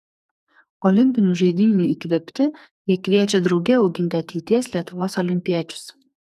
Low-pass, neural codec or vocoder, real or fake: 14.4 kHz; codec, 44.1 kHz, 2.6 kbps, SNAC; fake